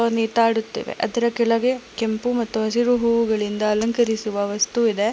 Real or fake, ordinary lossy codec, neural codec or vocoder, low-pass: real; none; none; none